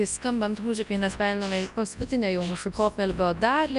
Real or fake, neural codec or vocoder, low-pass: fake; codec, 24 kHz, 0.9 kbps, WavTokenizer, large speech release; 10.8 kHz